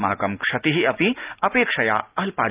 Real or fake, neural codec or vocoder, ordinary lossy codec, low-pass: real; none; Opus, 24 kbps; 3.6 kHz